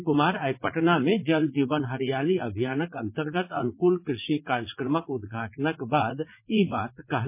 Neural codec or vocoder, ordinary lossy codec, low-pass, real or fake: vocoder, 44.1 kHz, 80 mel bands, Vocos; MP3, 24 kbps; 3.6 kHz; fake